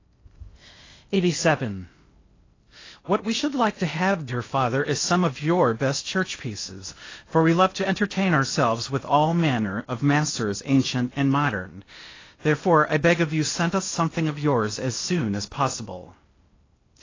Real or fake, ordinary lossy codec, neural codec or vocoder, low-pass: fake; AAC, 32 kbps; codec, 16 kHz in and 24 kHz out, 0.6 kbps, FocalCodec, streaming, 4096 codes; 7.2 kHz